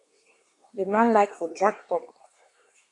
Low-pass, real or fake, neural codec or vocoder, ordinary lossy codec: 10.8 kHz; fake; codec, 24 kHz, 1 kbps, SNAC; AAC, 48 kbps